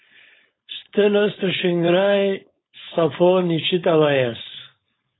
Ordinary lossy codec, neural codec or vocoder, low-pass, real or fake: AAC, 16 kbps; codec, 16 kHz, 4.8 kbps, FACodec; 7.2 kHz; fake